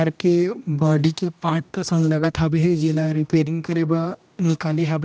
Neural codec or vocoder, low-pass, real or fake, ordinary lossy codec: codec, 16 kHz, 1 kbps, X-Codec, HuBERT features, trained on general audio; none; fake; none